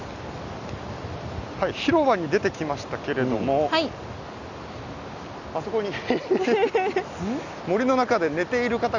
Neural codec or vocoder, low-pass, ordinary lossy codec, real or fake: none; 7.2 kHz; none; real